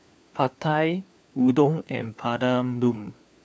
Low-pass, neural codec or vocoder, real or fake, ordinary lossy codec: none; codec, 16 kHz, 4 kbps, FunCodec, trained on LibriTTS, 50 frames a second; fake; none